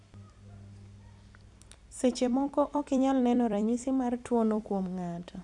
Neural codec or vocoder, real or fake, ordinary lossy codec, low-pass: vocoder, 44.1 kHz, 128 mel bands every 256 samples, BigVGAN v2; fake; none; 10.8 kHz